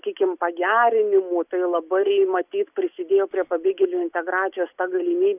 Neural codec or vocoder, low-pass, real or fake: none; 3.6 kHz; real